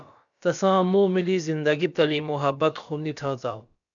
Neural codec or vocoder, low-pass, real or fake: codec, 16 kHz, about 1 kbps, DyCAST, with the encoder's durations; 7.2 kHz; fake